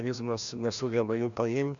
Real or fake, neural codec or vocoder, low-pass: fake; codec, 16 kHz, 1 kbps, FreqCodec, larger model; 7.2 kHz